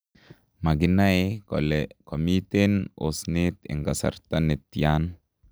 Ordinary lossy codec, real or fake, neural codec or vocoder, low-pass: none; real; none; none